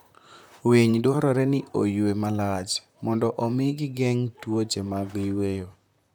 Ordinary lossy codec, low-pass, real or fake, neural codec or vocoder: none; none; fake; vocoder, 44.1 kHz, 128 mel bands, Pupu-Vocoder